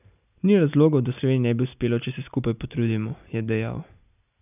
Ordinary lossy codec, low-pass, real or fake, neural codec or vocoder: none; 3.6 kHz; real; none